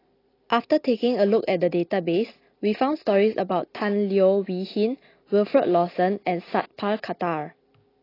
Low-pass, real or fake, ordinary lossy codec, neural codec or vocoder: 5.4 kHz; real; AAC, 24 kbps; none